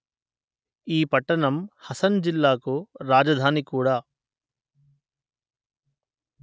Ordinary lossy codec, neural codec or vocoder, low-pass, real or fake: none; none; none; real